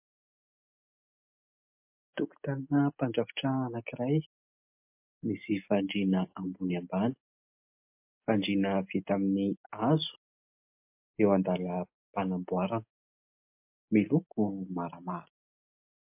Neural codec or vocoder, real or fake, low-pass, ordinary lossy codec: none; real; 3.6 kHz; MP3, 32 kbps